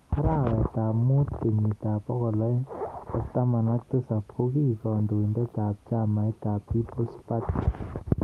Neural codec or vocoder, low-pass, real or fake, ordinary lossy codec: none; 14.4 kHz; real; Opus, 24 kbps